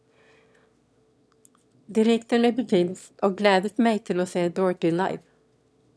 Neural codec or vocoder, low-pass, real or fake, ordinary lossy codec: autoencoder, 22.05 kHz, a latent of 192 numbers a frame, VITS, trained on one speaker; none; fake; none